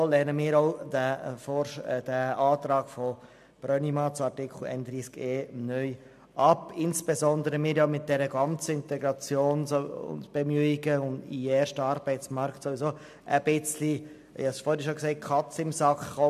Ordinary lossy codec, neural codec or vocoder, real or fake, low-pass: MP3, 96 kbps; none; real; 14.4 kHz